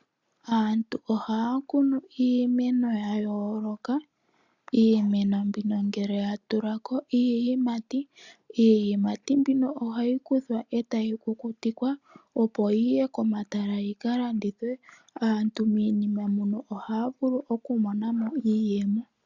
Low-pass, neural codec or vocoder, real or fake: 7.2 kHz; none; real